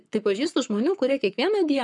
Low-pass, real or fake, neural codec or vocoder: 10.8 kHz; fake; codec, 44.1 kHz, 7.8 kbps, Pupu-Codec